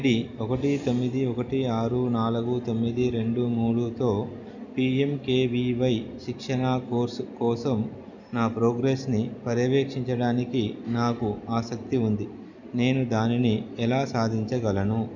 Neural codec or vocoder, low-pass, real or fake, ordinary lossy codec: none; 7.2 kHz; real; none